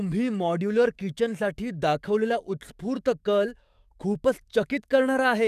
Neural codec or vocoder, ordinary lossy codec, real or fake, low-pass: codec, 44.1 kHz, 7.8 kbps, DAC; none; fake; 14.4 kHz